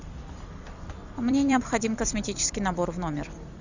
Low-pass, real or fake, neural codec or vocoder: 7.2 kHz; fake; vocoder, 44.1 kHz, 128 mel bands every 256 samples, BigVGAN v2